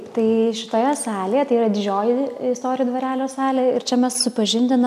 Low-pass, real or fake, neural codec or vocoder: 14.4 kHz; real; none